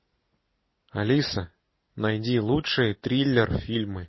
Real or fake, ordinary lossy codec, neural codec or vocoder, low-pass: real; MP3, 24 kbps; none; 7.2 kHz